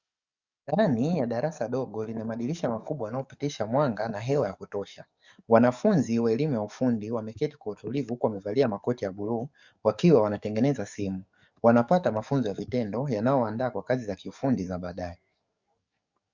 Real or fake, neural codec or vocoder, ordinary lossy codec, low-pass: fake; codec, 44.1 kHz, 7.8 kbps, DAC; Opus, 64 kbps; 7.2 kHz